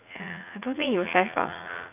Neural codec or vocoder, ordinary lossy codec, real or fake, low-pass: vocoder, 44.1 kHz, 80 mel bands, Vocos; none; fake; 3.6 kHz